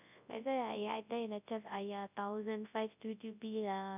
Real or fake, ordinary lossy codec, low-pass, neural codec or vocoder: fake; none; 3.6 kHz; codec, 24 kHz, 0.9 kbps, WavTokenizer, large speech release